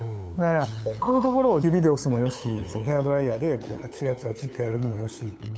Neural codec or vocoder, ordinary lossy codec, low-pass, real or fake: codec, 16 kHz, 8 kbps, FunCodec, trained on LibriTTS, 25 frames a second; none; none; fake